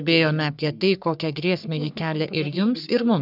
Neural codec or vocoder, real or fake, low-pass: codec, 44.1 kHz, 3.4 kbps, Pupu-Codec; fake; 5.4 kHz